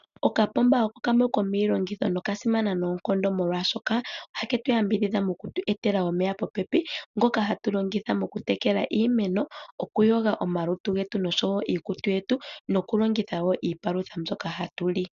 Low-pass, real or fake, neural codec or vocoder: 7.2 kHz; real; none